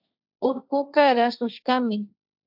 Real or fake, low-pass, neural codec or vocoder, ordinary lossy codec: fake; 5.4 kHz; codec, 16 kHz, 1.1 kbps, Voila-Tokenizer; AAC, 48 kbps